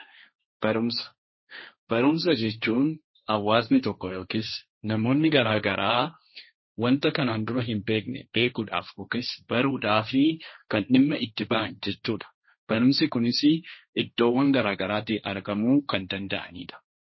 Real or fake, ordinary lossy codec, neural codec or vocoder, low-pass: fake; MP3, 24 kbps; codec, 16 kHz, 1.1 kbps, Voila-Tokenizer; 7.2 kHz